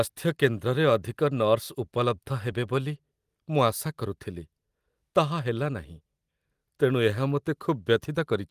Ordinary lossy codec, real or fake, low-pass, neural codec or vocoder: Opus, 24 kbps; real; 14.4 kHz; none